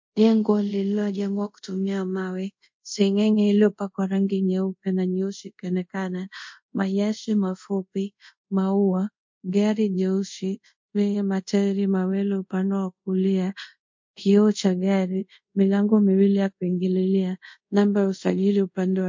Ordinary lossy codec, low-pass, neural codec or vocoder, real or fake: MP3, 48 kbps; 7.2 kHz; codec, 24 kHz, 0.5 kbps, DualCodec; fake